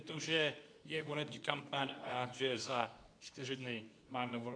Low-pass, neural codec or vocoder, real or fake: 9.9 kHz; codec, 24 kHz, 0.9 kbps, WavTokenizer, medium speech release version 2; fake